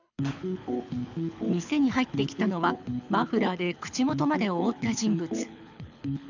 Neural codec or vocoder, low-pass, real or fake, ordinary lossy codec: codec, 24 kHz, 6 kbps, HILCodec; 7.2 kHz; fake; none